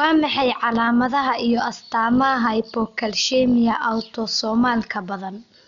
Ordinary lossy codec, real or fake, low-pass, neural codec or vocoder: none; real; 7.2 kHz; none